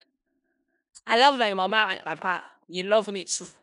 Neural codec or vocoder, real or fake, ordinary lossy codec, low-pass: codec, 16 kHz in and 24 kHz out, 0.4 kbps, LongCat-Audio-Codec, four codebook decoder; fake; none; 10.8 kHz